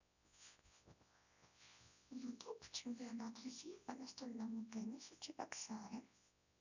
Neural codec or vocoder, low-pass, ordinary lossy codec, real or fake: codec, 24 kHz, 0.9 kbps, WavTokenizer, large speech release; 7.2 kHz; none; fake